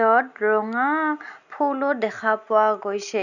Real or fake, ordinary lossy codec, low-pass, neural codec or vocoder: real; none; 7.2 kHz; none